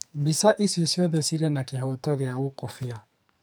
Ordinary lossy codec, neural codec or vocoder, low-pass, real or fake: none; codec, 44.1 kHz, 2.6 kbps, SNAC; none; fake